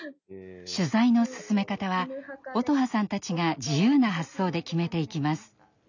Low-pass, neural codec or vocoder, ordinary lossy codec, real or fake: 7.2 kHz; none; none; real